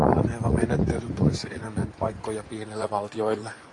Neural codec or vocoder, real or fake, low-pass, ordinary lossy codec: vocoder, 24 kHz, 100 mel bands, Vocos; fake; 10.8 kHz; AAC, 48 kbps